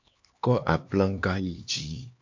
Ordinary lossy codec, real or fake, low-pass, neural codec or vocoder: AAC, 48 kbps; fake; 7.2 kHz; codec, 16 kHz, 2 kbps, X-Codec, HuBERT features, trained on LibriSpeech